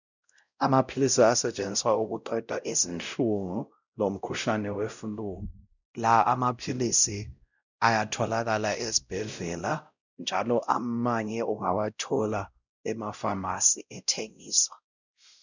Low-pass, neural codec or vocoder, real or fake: 7.2 kHz; codec, 16 kHz, 0.5 kbps, X-Codec, WavLM features, trained on Multilingual LibriSpeech; fake